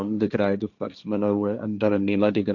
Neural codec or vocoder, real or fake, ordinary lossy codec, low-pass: codec, 16 kHz, 1.1 kbps, Voila-Tokenizer; fake; none; 7.2 kHz